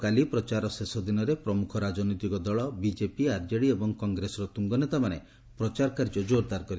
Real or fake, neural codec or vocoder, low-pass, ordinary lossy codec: real; none; none; none